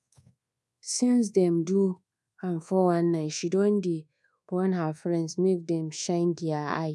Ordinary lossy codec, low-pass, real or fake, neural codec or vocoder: none; none; fake; codec, 24 kHz, 1.2 kbps, DualCodec